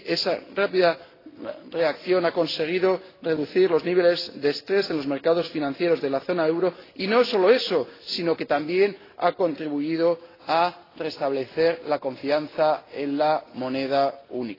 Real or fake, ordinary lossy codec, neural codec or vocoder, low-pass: real; AAC, 24 kbps; none; 5.4 kHz